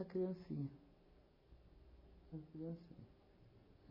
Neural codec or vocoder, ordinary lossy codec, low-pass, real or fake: none; MP3, 24 kbps; 5.4 kHz; real